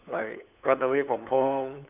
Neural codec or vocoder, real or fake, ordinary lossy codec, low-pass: codec, 16 kHz, 8 kbps, FunCodec, trained on LibriTTS, 25 frames a second; fake; none; 3.6 kHz